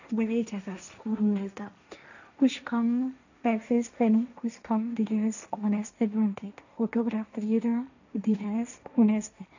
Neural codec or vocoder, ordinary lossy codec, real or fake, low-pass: codec, 16 kHz, 1.1 kbps, Voila-Tokenizer; none; fake; 7.2 kHz